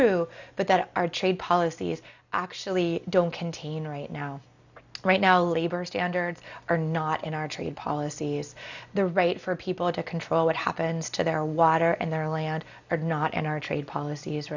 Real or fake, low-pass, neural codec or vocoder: real; 7.2 kHz; none